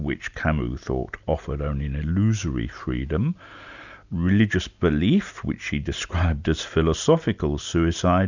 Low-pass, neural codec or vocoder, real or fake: 7.2 kHz; none; real